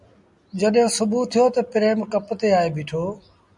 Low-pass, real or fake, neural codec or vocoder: 10.8 kHz; real; none